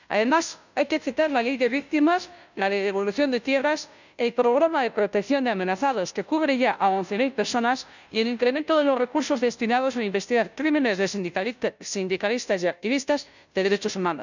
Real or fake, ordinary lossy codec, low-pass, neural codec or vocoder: fake; none; 7.2 kHz; codec, 16 kHz, 0.5 kbps, FunCodec, trained on Chinese and English, 25 frames a second